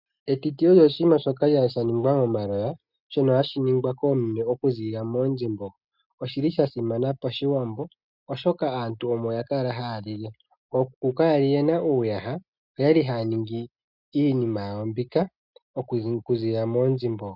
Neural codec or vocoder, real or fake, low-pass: none; real; 5.4 kHz